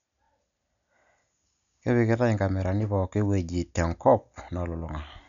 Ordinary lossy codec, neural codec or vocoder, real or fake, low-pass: none; none; real; 7.2 kHz